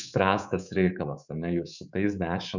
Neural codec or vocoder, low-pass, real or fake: codec, 24 kHz, 3.1 kbps, DualCodec; 7.2 kHz; fake